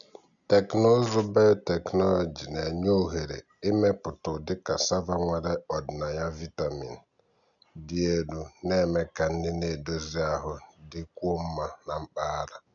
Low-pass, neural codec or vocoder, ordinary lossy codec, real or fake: 7.2 kHz; none; none; real